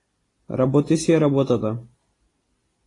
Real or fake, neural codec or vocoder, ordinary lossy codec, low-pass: real; none; AAC, 32 kbps; 10.8 kHz